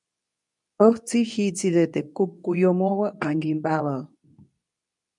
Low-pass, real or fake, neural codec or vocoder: 10.8 kHz; fake; codec, 24 kHz, 0.9 kbps, WavTokenizer, medium speech release version 2